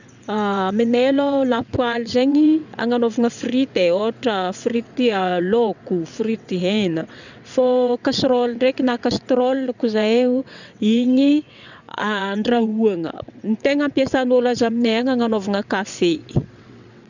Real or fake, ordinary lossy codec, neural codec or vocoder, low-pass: fake; none; vocoder, 22.05 kHz, 80 mel bands, WaveNeXt; 7.2 kHz